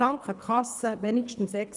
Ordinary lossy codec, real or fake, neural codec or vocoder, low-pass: none; fake; codec, 24 kHz, 3 kbps, HILCodec; 10.8 kHz